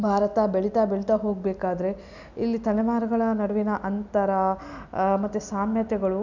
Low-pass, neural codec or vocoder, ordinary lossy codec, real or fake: 7.2 kHz; none; none; real